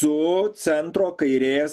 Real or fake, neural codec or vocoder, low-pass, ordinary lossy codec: real; none; 14.4 kHz; MP3, 96 kbps